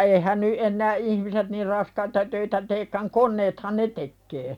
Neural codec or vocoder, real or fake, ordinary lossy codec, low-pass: none; real; none; 19.8 kHz